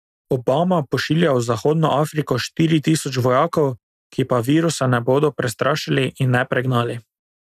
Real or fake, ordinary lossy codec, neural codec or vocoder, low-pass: real; none; none; 14.4 kHz